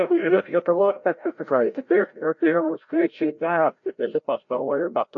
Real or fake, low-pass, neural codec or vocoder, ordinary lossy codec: fake; 7.2 kHz; codec, 16 kHz, 0.5 kbps, FreqCodec, larger model; AAC, 48 kbps